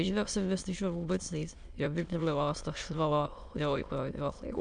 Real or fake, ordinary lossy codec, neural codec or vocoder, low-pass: fake; MP3, 64 kbps; autoencoder, 22.05 kHz, a latent of 192 numbers a frame, VITS, trained on many speakers; 9.9 kHz